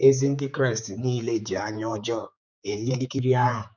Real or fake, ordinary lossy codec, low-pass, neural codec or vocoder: fake; none; 7.2 kHz; codec, 16 kHz, 4 kbps, X-Codec, HuBERT features, trained on general audio